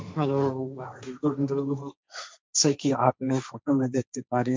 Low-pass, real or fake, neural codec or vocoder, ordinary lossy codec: none; fake; codec, 16 kHz, 1.1 kbps, Voila-Tokenizer; none